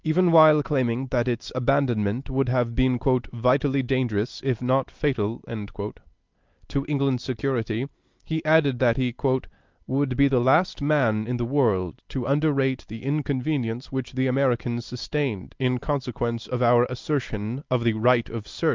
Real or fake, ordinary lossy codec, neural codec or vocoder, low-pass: real; Opus, 24 kbps; none; 7.2 kHz